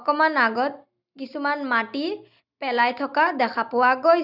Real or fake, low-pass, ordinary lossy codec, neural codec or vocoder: real; 5.4 kHz; none; none